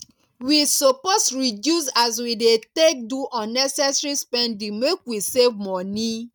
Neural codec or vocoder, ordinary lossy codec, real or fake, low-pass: none; none; real; 19.8 kHz